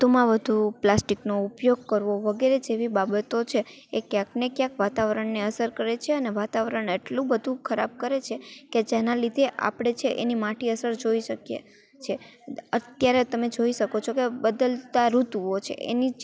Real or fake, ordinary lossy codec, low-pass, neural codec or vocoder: real; none; none; none